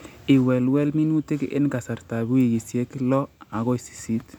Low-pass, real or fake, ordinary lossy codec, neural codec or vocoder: 19.8 kHz; real; none; none